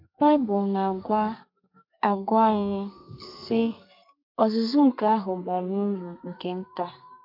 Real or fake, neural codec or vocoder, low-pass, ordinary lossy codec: fake; codec, 32 kHz, 1.9 kbps, SNAC; 5.4 kHz; MP3, 48 kbps